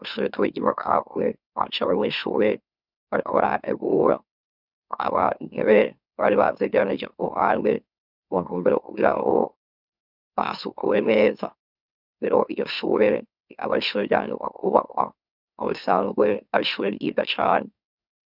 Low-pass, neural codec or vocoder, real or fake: 5.4 kHz; autoencoder, 44.1 kHz, a latent of 192 numbers a frame, MeloTTS; fake